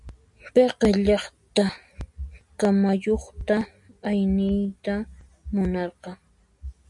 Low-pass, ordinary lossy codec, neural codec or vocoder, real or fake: 10.8 kHz; MP3, 96 kbps; none; real